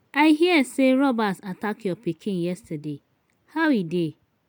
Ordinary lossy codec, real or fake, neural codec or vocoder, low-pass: none; real; none; none